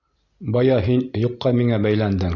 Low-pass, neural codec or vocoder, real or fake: 7.2 kHz; none; real